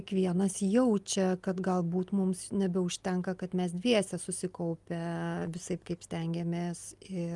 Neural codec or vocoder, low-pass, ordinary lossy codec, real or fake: none; 10.8 kHz; Opus, 32 kbps; real